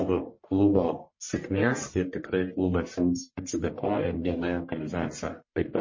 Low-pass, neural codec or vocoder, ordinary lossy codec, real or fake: 7.2 kHz; codec, 44.1 kHz, 1.7 kbps, Pupu-Codec; MP3, 32 kbps; fake